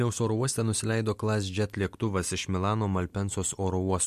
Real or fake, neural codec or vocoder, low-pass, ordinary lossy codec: real; none; 14.4 kHz; MP3, 64 kbps